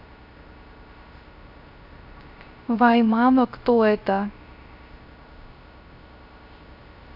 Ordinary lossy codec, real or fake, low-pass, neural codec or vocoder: AAC, 48 kbps; fake; 5.4 kHz; codec, 16 kHz, 0.3 kbps, FocalCodec